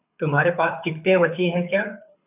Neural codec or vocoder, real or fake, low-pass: codec, 24 kHz, 6 kbps, HILCodec; fake; 3.6 kHz